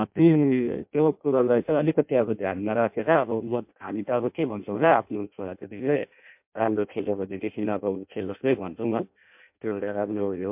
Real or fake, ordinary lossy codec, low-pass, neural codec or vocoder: fake; MP3, 32 kbps; 3.6 kHz; codec, 16 kHz in and 24 kHz out, 0.6 kbps, FireRedTTS-2 codec